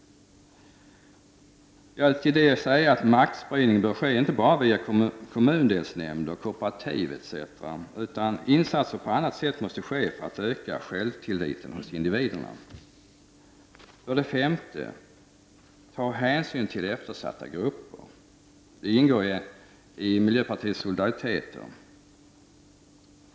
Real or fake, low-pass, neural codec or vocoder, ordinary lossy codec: real; none; none; none